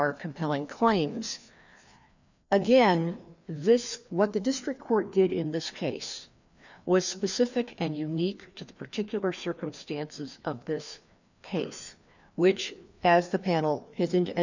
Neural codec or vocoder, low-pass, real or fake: codec, 16 kHz, 2 kbps, FreqCodec, larger model; 7.2 kHz; fake